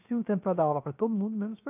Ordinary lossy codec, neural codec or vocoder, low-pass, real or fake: none; codec, 16 kHz, 0.7 kbps, FocalCodec; 3.6 kHz; fake